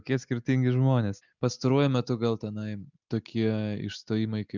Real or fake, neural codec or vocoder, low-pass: real; none; 7.2 kHz